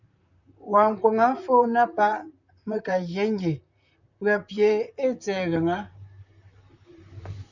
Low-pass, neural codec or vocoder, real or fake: 7.2 kHz; vocoder, 44.1 kHz, 128 mel bands, Pupu-Vocoder; fake